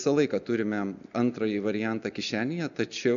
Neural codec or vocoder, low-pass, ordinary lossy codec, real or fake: none; 7.2 kHz; MP3, 96 kbps; real